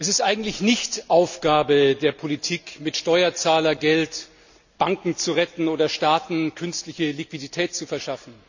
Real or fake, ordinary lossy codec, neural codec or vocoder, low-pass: real; none; none; 7.2 kHz